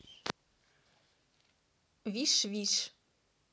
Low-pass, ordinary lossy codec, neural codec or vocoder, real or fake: none; none; none; real